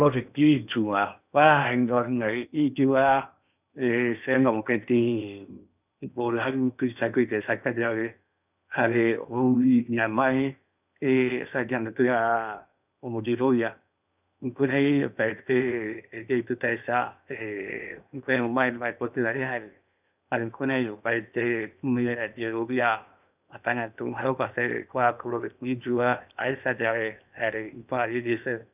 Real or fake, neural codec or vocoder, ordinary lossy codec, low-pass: fake; codec, 16 kHz in and 24 kHz out, 0.8 kbps, FocalCodec, streaming, 65536 codes; none; 3.6 kHz